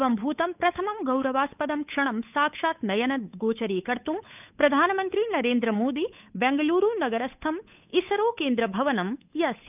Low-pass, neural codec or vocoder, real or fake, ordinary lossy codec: 3.6 kHz; codec, 16 kHz, 8 kbps, FunCodec, trained on Chinese and English, 25 frames a second; fake; none